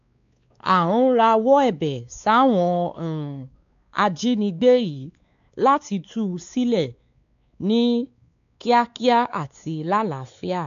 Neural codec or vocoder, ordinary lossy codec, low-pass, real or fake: codec, 16 kHz, 4 kbps, X-Codec, WavLM features, trained on Multilingual LibriSpeech; none; 7.2 kHz; fake